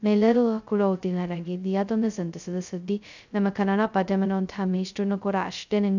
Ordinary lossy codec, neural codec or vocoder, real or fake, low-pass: none; codec, 16 kHz, 0.2 kbps, FocalCodec; fake; 7.2 kHz